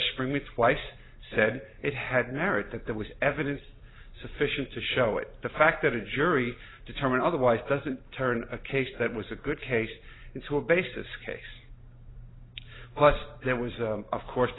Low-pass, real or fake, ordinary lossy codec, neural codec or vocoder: 7.2 kHz; real; AAC, 16 kbps; none